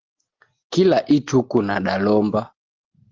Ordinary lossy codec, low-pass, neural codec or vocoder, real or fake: Opus, 16 kbps; 7.2 kHz; none; real